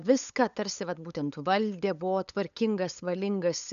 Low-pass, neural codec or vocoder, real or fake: 7.2 kHz; codec, 16 kHz, 8 kbps, FunCodec, trained on LibriTTS, 25 frames a second; fake